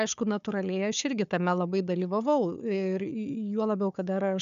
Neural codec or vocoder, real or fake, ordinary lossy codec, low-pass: codec, 16 kHz, 4 kbps, FunCodec, trained on Chinese and English, 50 frames a second; fake; MP3, 96 kbps; 7.2 kHz